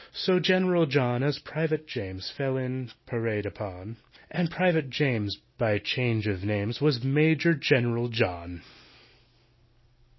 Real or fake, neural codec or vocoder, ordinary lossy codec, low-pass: real; none; MP3, 24 kbps; 7.2 kHz